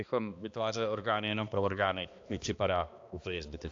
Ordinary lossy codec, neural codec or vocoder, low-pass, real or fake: AAC, 48 kbps; codec, 16 kHz, 2 kbps, X-Codec, HuBERT features, trained on balanced general audio; 7.2 kHz; fake